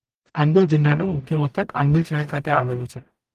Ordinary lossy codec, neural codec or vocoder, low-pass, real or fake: Opus, 24 kbps; codec, 44.1 kHz, 0.9 kbps, DAC; 14.4 kHz; fake